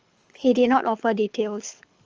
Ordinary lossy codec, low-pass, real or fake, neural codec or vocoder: Opus, 24 kbps; 7.2 kHz; fake; codec, 24 kHz, 6 kbps, HILCodec